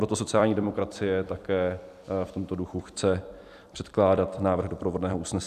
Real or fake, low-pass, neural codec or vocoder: fake; 14.4 kHz; vocoder, 44.1 kHz, 128 mel bands every 256 samples, BigVGAN v2